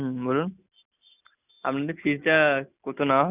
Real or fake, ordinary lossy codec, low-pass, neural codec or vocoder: real; none; 3.6 kHz; none